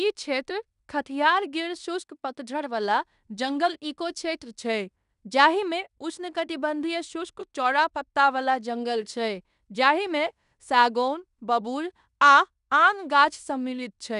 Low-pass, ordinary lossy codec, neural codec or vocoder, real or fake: 10.8 kHz; none; codec, 16 kHz in and 24 kHz out, 0.9 kbps, LongCat-Audio-Codec, fine tuned four codebook decoder; fake